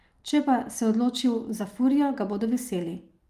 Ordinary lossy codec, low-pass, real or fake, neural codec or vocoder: Opus, 32 kbps; 14.4 kHz; real; none